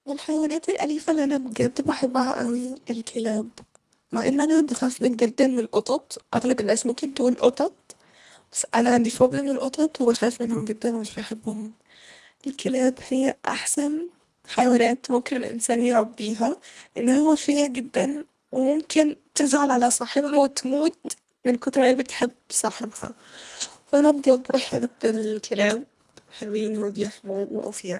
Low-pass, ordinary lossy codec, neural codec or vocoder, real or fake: none; none; codec, 24 kHz, 1.5 kbps, HILCodec; fake